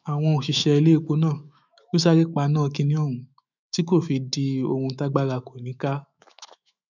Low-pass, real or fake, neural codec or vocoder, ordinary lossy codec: 7.2 kHz; fake; autoencoder, 48 kHz, 128 numbers a frame, DAC-VAE, trained on Japanese speech; none